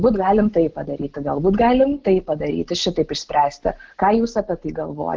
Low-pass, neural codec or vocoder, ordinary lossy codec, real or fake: 7.2 kHz; none; Opus, 16 kbps; real